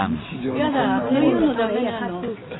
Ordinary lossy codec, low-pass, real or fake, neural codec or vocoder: AAC, 16 kbps; 7.2 kHz; real; none